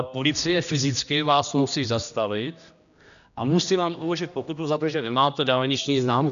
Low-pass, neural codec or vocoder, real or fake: 7.2 kHz; codec, 16 kHz, 1 kbps, X-Codec, HuBERT features, trained on general audio; fake